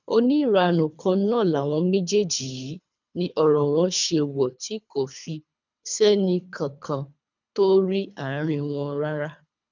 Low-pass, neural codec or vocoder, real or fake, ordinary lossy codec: 7.2 kHz; codec, 24 kHz, 3 kbps, HILCodec; fake; none